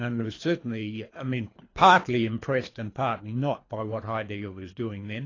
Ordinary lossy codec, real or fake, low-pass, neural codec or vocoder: AAC, 32 kbps; fake; 7.2 kHz; codec, 24 kHz, 3 kbps, HILCodec